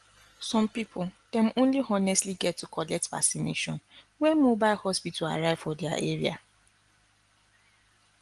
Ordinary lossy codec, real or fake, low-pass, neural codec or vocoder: Opus, 24 kbps; real; 10.8 kHz; none